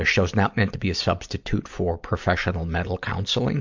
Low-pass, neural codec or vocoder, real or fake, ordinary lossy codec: 7.2 kHz; none; real; MP3, 64 kbps